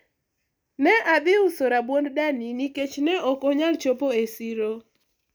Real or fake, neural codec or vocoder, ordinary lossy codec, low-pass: real; none; none; none